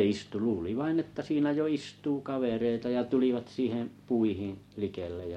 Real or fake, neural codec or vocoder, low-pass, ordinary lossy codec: real; none; 19.8 kHz; MP3, 64 kbps